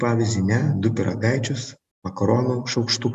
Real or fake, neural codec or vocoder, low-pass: real; none; 14.4 kHz